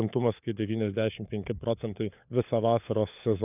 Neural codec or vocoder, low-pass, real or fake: codec, 16 kHz, 4 kbps, FunCodec, trained on LibriTTS, 50 frames a second; 3.6 kHz; fake